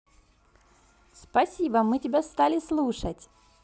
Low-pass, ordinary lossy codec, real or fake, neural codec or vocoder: none; none; real; none